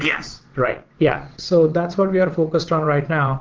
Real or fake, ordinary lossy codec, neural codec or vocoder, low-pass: fake; Opus, 16 kbps; vocoder, 22.05 kHz, 80 mel bands, WaveNeXt; 7.2 kHz